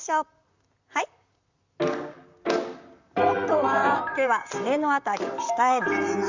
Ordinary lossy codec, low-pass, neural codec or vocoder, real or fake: Opus, 64 kbps; 7.2 kHz; vocoder, 44.1 kHz, 128 mel bands, Pupu-Vocoder; fake